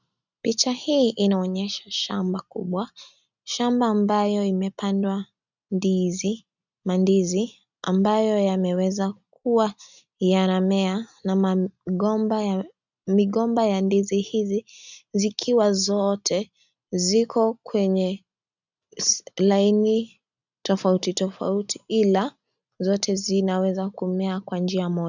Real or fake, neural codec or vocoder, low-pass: real; none; 7.2 kHz